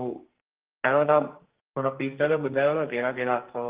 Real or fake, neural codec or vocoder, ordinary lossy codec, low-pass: fake; codec, 44.1 kHz, 2.6 kbps, SNAC; Opus, 16 kbps; 3.6 kHz